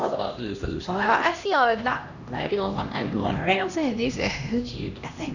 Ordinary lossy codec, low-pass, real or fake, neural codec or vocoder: none; 7.2 kHz; fake; codec, 16 kHz, 1 kbps, X-Codec, HuBERT features, trained on LibriSpeech